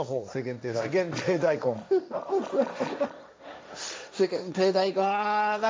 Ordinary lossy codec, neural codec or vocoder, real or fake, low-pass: none; codec, 16 kHz, 1.1 kbps, Voila-Tokenizer; fake; none